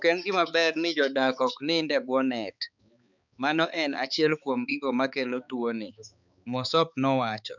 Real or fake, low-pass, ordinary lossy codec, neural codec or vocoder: fake; 7.2 kHz; none; codec, 16 kHz, 4 kbps, X-Codec, HuBERT features, trained on balanced general audio